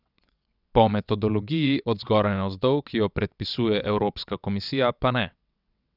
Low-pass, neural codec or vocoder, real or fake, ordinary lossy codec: 5.4 kHz; vocoder, 22.05 kHz, 80 mel bands, WaveNeXt; fake; none